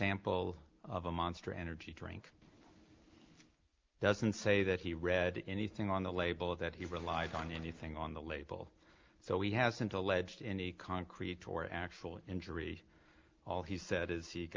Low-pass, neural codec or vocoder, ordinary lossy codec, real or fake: 7.2 kHz; none; Opus, 24 kbps; real